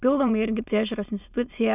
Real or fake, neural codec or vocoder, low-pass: fake; autoencoder, 22.05 kHz, a latent of 192 numbers a frame, VITS, trained on many speakers; 3.6 kHz